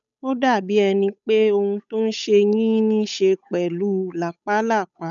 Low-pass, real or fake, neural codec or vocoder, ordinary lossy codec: 7.2 kHz; fake; codec, 16 kHz, 8 kbps, FunCodec, trained on Chinese and English, 25 frames a second; none